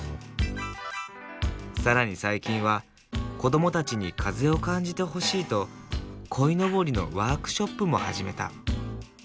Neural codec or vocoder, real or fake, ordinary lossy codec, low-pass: none; real; none; none